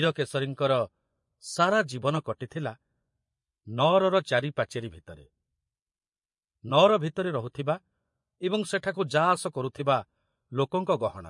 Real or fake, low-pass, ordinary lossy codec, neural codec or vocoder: fake; 10.8 kHz; MP3, 48 kbps; vocoder, 24 kHz, 100 mel bands, Vocos